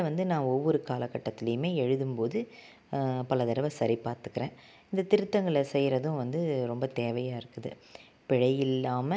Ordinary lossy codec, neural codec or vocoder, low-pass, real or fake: none; none; none; real